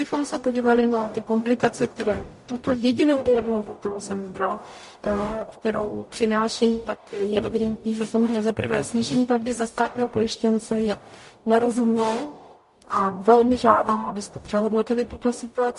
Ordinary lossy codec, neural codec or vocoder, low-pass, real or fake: MP3, 48 kbps; codec, 44.1 kHz, 0.9 kbps, DAC; 14.4 kHz; fake